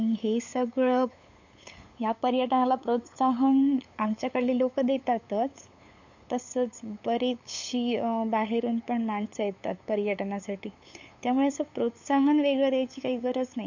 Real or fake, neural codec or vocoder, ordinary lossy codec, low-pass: fake; codec, 16 kHz, 8 kbps, FunCodec, trained on LibriTTS, 25 frames a second; MP3, 48 kbps; 7.2 kHz